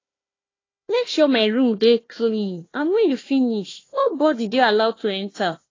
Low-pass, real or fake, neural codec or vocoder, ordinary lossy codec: 7.2 kHz; fake; codec, 16 kHz, 1 kbps, FunCodec, trained on Chinese and English, 50 frames a second; AAC, 32 kbps